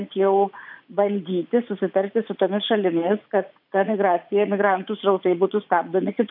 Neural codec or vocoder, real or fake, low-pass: vocoder, 44.1 kHz, 80 mel bands, Vocos; fake; 5.4 kHz